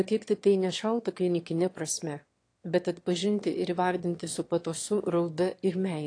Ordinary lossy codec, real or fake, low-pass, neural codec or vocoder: AAC, 48 kbps; fake; 9.9 kHz; autoencoder, 22.05 kHz, a latent of 192 numbers a frame, VITS, trained on one speaker